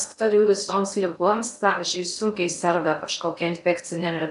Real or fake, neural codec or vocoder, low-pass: fake; codec, 16 kHz in and 24 kHz out, 0.6 kbps, FocalCodec, streaming, 2048 codes; 10.8 kHz